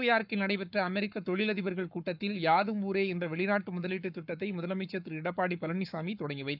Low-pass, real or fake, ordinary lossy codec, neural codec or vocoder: 5.4 kHz; fake; none; codec, 44.1 kHz, 7.8 kbps, Pupu-Codec